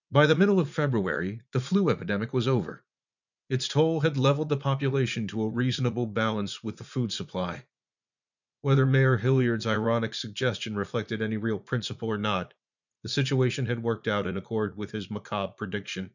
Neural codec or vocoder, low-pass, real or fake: vocoder, 44.1 kHz, 80 mel bands, Vocos; 7.2 kHz; fake